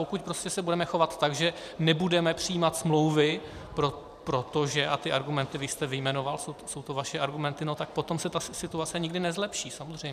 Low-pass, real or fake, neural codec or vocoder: 14.4 kHz; fake; vocoder, 44.1 kHz, 128 mel bands every 512 samples, BigVGAN v2